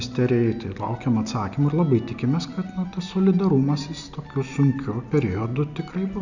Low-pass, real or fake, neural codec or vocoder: 7.2 kHz; real; none